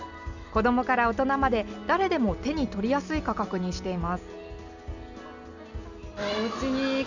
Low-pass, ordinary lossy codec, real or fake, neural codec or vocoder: 7.2 kHz; none; real; none